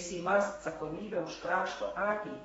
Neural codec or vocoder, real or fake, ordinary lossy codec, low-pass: codec, 32 kHz, 1.9 kbps, SNAC; fake; AAC, 24 kbps; 14.4 kHz